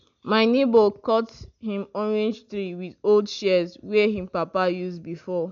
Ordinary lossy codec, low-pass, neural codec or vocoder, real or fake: MP3, 64 kbps; 7.2 kHz; none; real